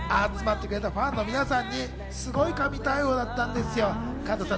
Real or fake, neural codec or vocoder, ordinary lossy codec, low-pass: real; none; none; none